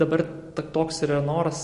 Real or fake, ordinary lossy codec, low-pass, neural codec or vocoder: real; MP3, 48 kbps; 14.4 kHz; none